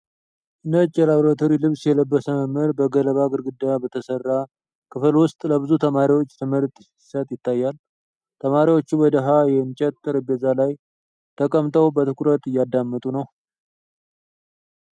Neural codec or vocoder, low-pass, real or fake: none; 9.9 kHz; real